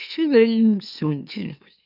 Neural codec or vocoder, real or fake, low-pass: autoencoder, 44.1 kHz, a latent of 192 numbers a frame, MeloTTS; fake; 5.4 kHz